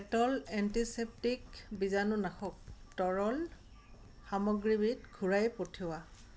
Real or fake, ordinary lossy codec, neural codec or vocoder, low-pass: real; none; none; none